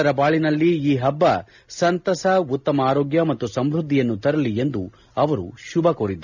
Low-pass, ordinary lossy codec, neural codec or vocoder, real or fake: 7.2 kHz; none; none; real